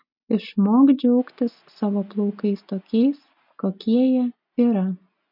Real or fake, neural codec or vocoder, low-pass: real; none; 5.4 kHz